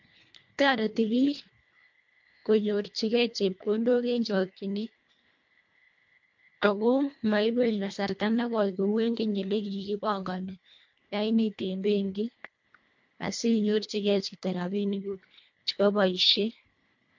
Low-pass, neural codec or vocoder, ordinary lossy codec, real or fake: 7.2 kHz; codec, 24 kHz, 1.5 kbps, HILCodec; MP3, 48 kbps; fake